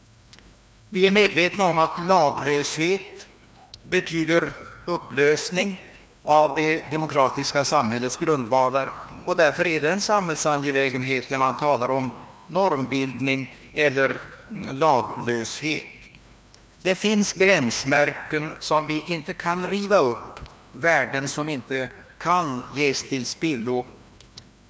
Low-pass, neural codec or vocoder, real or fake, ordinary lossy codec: none; codec, 16 kHz, 1 kbps, FreqCodec, larger model; fake; none